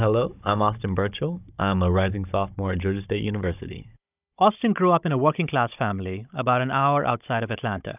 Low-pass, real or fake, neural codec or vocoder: 3.6 kHz; fake; codec, 16 kHz, 16 kbps, FunCodec, trained on Chinese and English, 50 frames a second